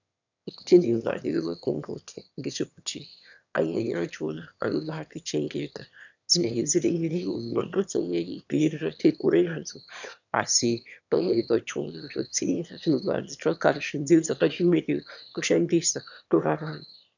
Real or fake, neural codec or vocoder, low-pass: fake; autoencoder, 22.05 kHz, a latent of 192 numbers a frame, VITS, trained on one speaker; 7.2 kHz